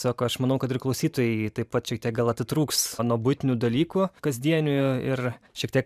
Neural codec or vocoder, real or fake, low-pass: vocoder, 44.1 kHz, 128 mel bands every 512 samples, BigVGAN v2; fake; 14.4 kHz